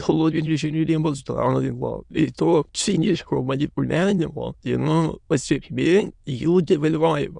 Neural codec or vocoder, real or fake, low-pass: autoencoder, 22.05 kHz, a latent of 192 numbers a frame, VITS, trained on many speakers; fake; 9.9 kHz